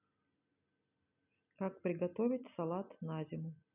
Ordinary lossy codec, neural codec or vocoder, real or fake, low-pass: none; none; real; 3.6 kHz